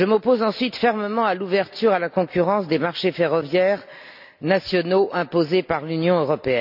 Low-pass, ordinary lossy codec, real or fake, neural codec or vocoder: 5.4 kHz; none; real; none